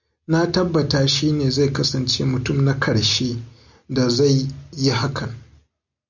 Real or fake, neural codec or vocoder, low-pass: real; none; 7.2 kHz